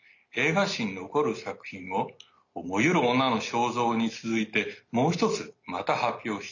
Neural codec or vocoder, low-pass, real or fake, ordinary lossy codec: none; 7.2 kHz; real; none